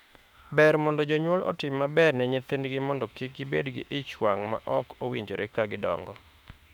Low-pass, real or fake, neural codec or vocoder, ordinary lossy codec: 19.8 kHz; fake; autoencoder, 48 kHz, 32 numbers a frame, DAC-VAE, trained on Japanese speech; none